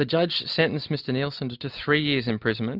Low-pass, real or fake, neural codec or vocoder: 5.4 kHz; real; none